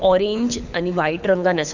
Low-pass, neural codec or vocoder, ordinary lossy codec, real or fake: 7.2 kHz; codec, 24 kHz, 6 kbps, HILCodec; none; fake